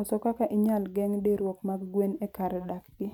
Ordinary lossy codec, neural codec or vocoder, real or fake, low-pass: none; none; real; 19.8 kHz